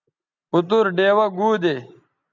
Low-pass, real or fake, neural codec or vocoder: 7.2 kHz; real; none